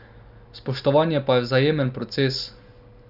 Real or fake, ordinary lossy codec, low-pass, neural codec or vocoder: real; Opus, 64 kbps; 5.4 kHz; none